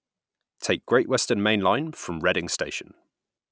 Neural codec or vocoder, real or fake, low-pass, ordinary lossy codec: none; real; none; none